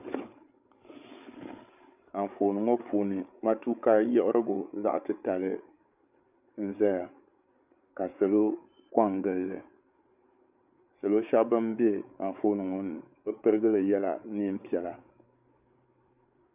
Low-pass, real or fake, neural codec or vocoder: 3.6 kHz; fake; codec, 16 kHz, 8 kbps, FreqCodec, larger model